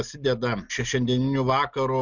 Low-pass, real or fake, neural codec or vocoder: 7.2 kHz; real; none